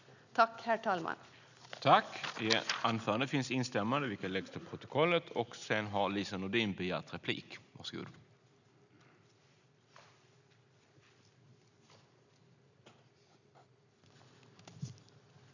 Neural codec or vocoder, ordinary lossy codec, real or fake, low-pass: none; none; real; 7.2 kHz